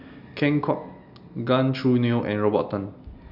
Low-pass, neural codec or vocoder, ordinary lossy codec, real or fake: 5.4 kHz; none; none; real